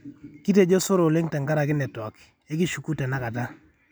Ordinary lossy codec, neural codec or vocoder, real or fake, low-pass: none; none; real; none